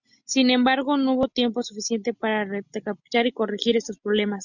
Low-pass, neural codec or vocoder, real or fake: 7.2 kHz; none; real